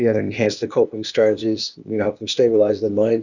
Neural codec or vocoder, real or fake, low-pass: codec, 16 kHz, 0.8 kbps, ZipCodec; fake; 7.2 kHz